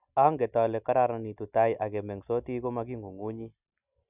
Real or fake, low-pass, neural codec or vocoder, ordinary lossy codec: real; 3.6 kHz; none; none